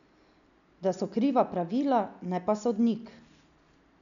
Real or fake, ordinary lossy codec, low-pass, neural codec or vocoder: real; none; 7.2 kHz; none